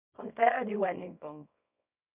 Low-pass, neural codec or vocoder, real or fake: 3.6 kHz; codec, 24 kHz, 0.9 kbps, WavTokenizer, small release; fake